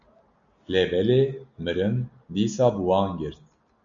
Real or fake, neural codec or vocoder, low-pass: real; none; 7.2 kHz